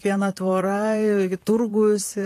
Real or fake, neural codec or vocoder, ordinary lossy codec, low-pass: fake; vocoder, 44.1 kHz, 128 mel bands, Pupu-Vocoder; AAC, 48 kbps; 14.4 kHz